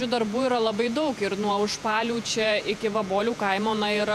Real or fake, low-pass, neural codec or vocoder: fake; 14.4 kHz; vocoder, 48 kHz, 128 mel bands, Vocos